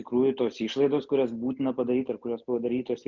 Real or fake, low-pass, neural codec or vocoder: real; 7.2 kHz; none